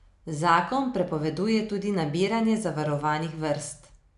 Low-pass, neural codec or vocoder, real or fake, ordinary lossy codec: 10.8 kHz; none; real; none